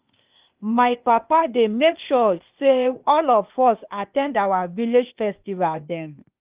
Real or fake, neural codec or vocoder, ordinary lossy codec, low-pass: fake; codec, 16 kHz, 0.8 kbps, ZipCodec; Opus, 24 kbps; 3.6 kHz